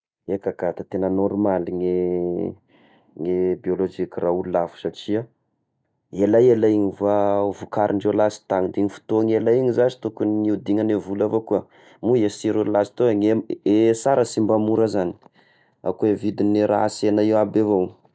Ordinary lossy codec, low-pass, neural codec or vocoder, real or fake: none; none; none; real